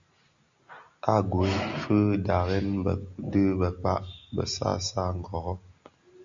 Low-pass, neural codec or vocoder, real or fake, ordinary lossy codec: 7.2 kHz; none; real; Opus, 64 kbps